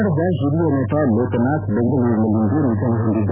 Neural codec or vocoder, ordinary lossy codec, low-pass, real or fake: none; none; 3.6 kHz; real